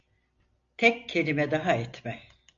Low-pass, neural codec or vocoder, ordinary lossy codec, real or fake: 7.2 kHz; none; MP3, 64 kbps; real